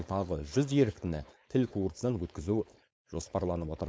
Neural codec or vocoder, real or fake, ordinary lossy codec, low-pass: codec, 16 kHz, 4.8 kbps, FACodec; fake; none; none